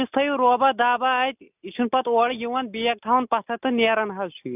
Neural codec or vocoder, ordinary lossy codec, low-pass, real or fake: none; none; 3.6 kHz; real